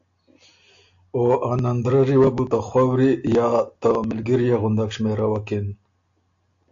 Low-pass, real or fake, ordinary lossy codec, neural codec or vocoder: 7.2 kHz; real; AAC, 64 kbps; none